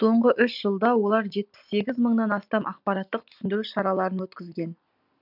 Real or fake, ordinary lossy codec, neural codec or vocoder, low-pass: real; none; none; 5.4 kHz